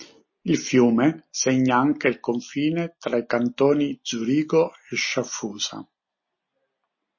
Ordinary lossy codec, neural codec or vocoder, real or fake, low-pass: MP3, 32 kbps; none; real; 7.2 kHz